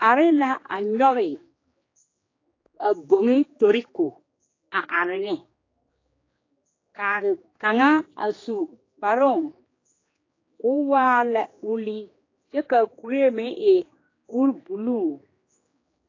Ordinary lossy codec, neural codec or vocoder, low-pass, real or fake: AAC, 32 kbps; codec, 16 kHz, 2 kbps, X-Codec, HuBERT features, trained on general audio; 7.2 kHz; fake